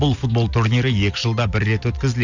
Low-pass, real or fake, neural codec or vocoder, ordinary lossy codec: 7.2 kHz; real; none; none